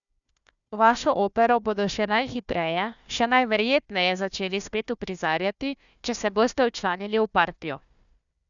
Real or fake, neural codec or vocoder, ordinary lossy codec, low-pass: fake; codec, 16 kHz, 1 kbps, FunCodec, trained on Chinese and English, 50 frames a second; none; 7.2 kHz